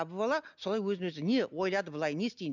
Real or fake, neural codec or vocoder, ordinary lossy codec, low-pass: real; none; none; 7.2 kHz